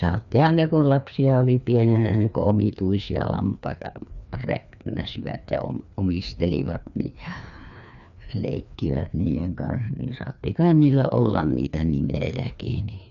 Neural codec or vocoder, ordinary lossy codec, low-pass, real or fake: codec, 16 kHz, 2 kbps, FreqCodec, larger model; none; 7.2 kHz; fake